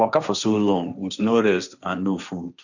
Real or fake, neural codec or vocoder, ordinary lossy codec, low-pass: fake; codec, 16 kHz, 1.1 kbps, Voila-Tokenizer; none; 7.2 kHz